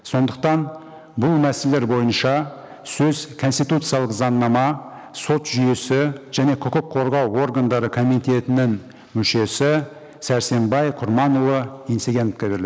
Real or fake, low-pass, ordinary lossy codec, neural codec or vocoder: real; none; none; none